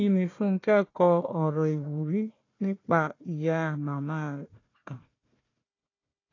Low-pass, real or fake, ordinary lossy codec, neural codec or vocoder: 7.2 kHz; fake; AAC, 32 kbps; codec, 16 kHz, 1 kbps, FunCodec, trained on Chinese and English, 50 frames a second